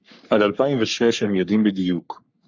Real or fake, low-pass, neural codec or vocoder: fake; 7.2 kHz; codec, 44.1 kHz, 3.4 kbps, Pupu-Codec